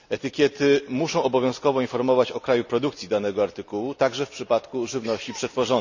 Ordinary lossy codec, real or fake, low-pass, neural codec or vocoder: none; real; 7.2 kHz; none